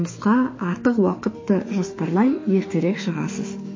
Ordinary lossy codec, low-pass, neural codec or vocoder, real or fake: MP3, 32 kbps; 7.2 kHz; autoencoder, 48 kHz, 32 numbers a frame, DAC-VAE, trained on Japanese speech; fake